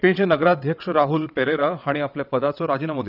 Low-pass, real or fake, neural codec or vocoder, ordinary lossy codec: 5.4 kHz; fake; vocoder, 22.05 kHz, 80 mel bands, WaveNeXt; none